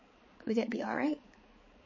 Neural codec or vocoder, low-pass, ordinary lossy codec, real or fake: codec, 16 kHz, 4 kbps, X-Codec, HuBERT features, trained on balanced general audio; 7.2 kHz; MP3, 32 kbps; fake